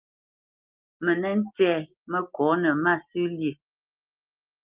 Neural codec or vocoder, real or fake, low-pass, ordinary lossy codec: none; real; 3.6 kHz; Opus, 24 kbps